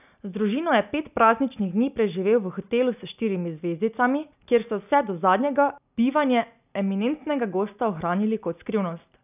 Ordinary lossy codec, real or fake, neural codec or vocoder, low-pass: none; real; none; 3.6 kHz